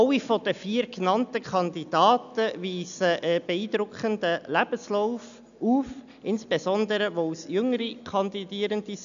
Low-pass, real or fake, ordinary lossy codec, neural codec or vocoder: 7.2 kHz; real; none; none